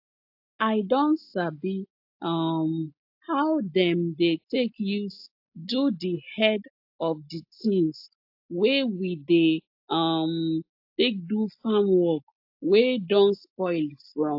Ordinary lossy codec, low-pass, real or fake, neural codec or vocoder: AAC, 48 kbps; 5.4 kHz; real; none